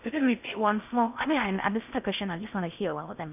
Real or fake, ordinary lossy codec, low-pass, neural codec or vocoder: fake; none; 3.6 kHz; codec, 16 kHz in and 24 kHz out, 0.6 kbps, FocalCodec, streaming, 4096 codes